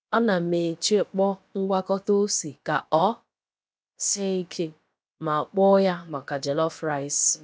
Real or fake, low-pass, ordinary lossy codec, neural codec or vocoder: fake; none; none; codec, 16 kHz, about 1 kbps, DyCAST, with the encoder's durations